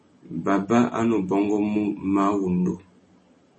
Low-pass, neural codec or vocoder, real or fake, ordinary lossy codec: 10.8 kHz; none; real; MP3, 32 kbps